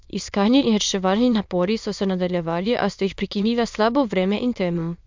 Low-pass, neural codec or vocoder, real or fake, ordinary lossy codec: 7.2 kHz; autoencoder, 22.05 kHz, a latent of 192 numbers a frame, VITS, trained on many speakers; fake; MP3, 64 kbps